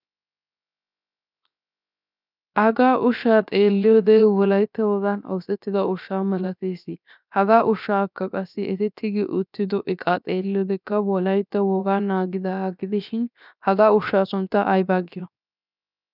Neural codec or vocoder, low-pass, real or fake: codec, 16 kHz, 0.7 kbps, FocalCodec; 5.4 kHz; fake